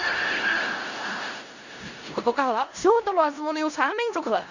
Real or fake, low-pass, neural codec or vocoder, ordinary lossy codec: fake; 7.2 kHz; codec, 16 kHz in and 24 kHz out, 0.4 kbps, LongCat-Audio-Codec, four codebook decoder; Opus, 64 kbps